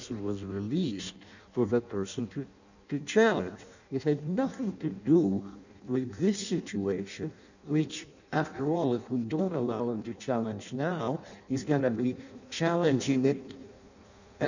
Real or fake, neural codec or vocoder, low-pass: fake; codec, 16 kHz in and 24 kHz out, 0.6 kbps, FireRedTTS-2 codec; 7.2 kHz